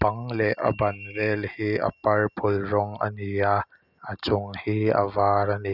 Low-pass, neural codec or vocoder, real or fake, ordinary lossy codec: 5.4 kHz; none; real; none